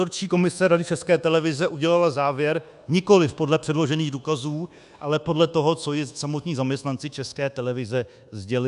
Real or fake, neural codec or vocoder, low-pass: fake; codec, 24 kHz, 1.2 kbps, DualCodec; 10.8 kHz